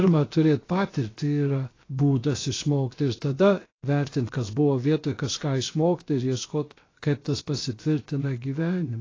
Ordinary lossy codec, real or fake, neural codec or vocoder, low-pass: AAC, 32 kbps; fake; codec, 16 kHz, about 1 kbps, DyCAST, with the encoder's durations; 7.2 kHz